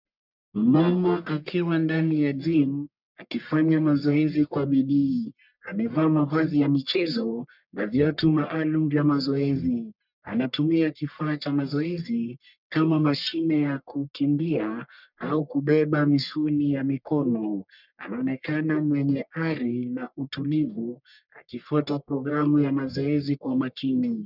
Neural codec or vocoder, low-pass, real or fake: codec, 44.1 kHz, 1.7 kbps, Pupu-Codec; 5.4 kHz; fake